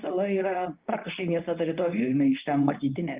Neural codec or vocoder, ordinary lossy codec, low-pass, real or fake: codec, 24 kHz, 0.9 kbps, WavTokenizer, medium speech release version 2; Opus, 32 kbps; 3.6 kHz; fake